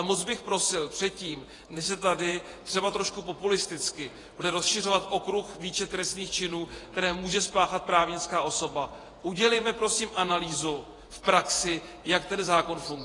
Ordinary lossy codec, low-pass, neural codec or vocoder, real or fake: AAC, 32 kbps; 10.8 kHz; vocoder, 48 kHz, 128 mel bands, Vocos; fake